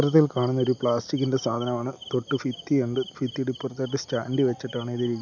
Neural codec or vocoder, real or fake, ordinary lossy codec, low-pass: none; real; none; 7.2 kHz